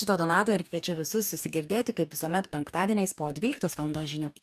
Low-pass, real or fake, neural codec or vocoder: 14.4 kHz; fake; codec, 44.1 kHz, 2.6 kbps, DAC